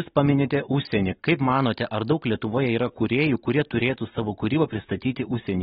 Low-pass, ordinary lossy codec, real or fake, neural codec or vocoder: 7.2 kHz; AAC, 16 kbps; real; none